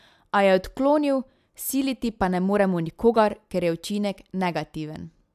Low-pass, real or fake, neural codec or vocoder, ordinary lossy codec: 14.4 kHz; real; none; AAC, 96 kbps